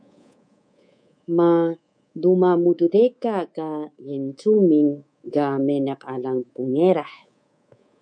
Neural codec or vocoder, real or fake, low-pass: codec, 24 kHz, 3.1 kbps, DualCodec; fake; 9.9 kHz